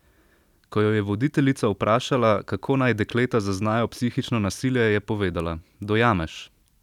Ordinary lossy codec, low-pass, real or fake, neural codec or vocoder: none; 19.8 kHz; fake; vocoder, 44.1 kHz, 128 mel bands every 256 samples, BigVGAN v2